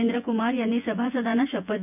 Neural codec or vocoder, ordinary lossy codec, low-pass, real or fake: vocoder, 24 kHz, 100 mel bands, Vocos; none; 3.6 kHz; fake